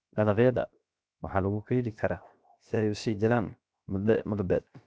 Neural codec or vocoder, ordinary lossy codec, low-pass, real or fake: codec, 16 kHz, 0.7 kbps, FocalCodec; none; none; fake